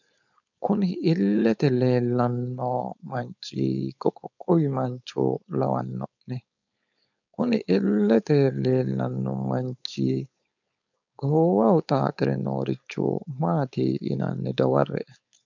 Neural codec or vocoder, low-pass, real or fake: codec, 16 kHz, 4.8 kbps, FACodec; 7.2 kHz; fake